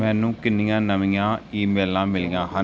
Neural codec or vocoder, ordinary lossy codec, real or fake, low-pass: none; none; real; none